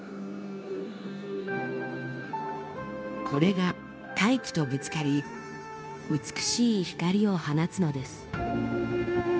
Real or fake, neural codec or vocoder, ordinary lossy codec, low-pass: fake; codec, 16 kHz, 0.9 kbps, LongCat-Audio-Codec; none; none